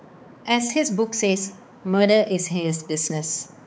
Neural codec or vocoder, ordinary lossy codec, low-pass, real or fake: codec, 16 kHz, 4 kbps, X-Codec, HuBERT features, trained on balanced general audio; none; none; fake